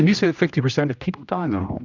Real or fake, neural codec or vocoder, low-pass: fake; codec, 16 kHz, 1 kbps, X-Codec, HuBERT features, trained on general audio; 7.2 kHz